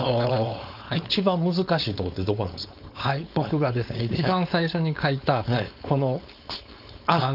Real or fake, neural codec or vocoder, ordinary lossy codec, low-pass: fake; codec, 16 kHz, 4.8 kbps, FACodec; none; 5.4 kHz